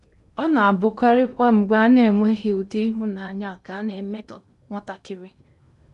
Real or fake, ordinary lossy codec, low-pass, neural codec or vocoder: fake; none; 10.8 kHz; codec, 16 kHz in and 24 kHz out, 0.8 kbps, FocalCodec, streaming, 65536 codes